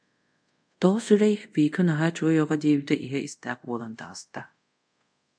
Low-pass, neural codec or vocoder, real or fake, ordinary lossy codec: 9.9 kHz; codec, 24 kHz, 0.5 kbps, DualCodec; fake; MP3, 96 kbps